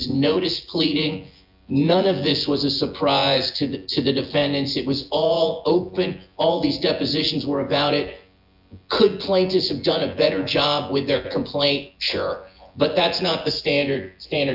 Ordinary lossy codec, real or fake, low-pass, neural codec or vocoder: AAC, 48 kbps; fake; 5.4 kHz; vocoder, 24 kHz, 100 mel bands, Vocos